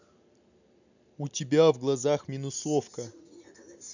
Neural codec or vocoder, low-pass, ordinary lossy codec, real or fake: none; 7.2 kHz; none; real